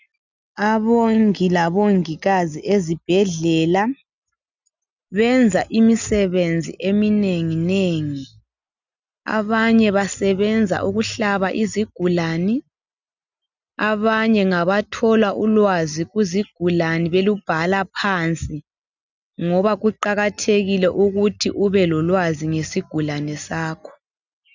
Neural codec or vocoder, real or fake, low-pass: none; real; 7.2 kHz